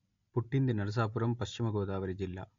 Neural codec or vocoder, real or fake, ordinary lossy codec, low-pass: none; real; MP3, 48 kbps; 7.2 kHz